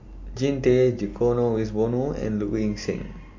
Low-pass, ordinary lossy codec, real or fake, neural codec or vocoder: 7.2 kHz; MP3, 48 kbps; real; none